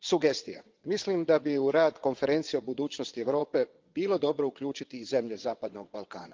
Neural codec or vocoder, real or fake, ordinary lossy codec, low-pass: vocoder, 22.05 kHz, 80 mel bands, WaveNeXt; fake; Opus, 32 kbps; 7.2 kHz